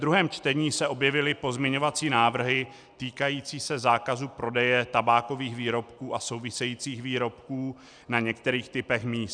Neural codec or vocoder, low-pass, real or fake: none; 9.9 kHz; real